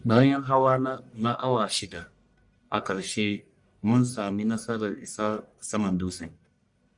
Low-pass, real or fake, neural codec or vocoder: 10.8 kHz; fake; codec, 44.1 kHz, 1.7 kbps, Pupu-Codec